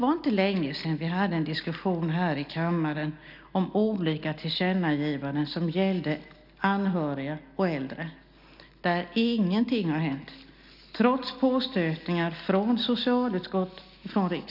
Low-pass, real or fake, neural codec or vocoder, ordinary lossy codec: 5.4 kHz; real; none; none